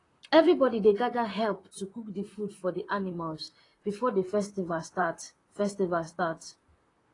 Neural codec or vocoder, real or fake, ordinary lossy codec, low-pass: vocoder, 44.1 kHz, 128 mel bands, Pupu-Vocoder; fake; AAC, 32 kbps; 10.8 kHz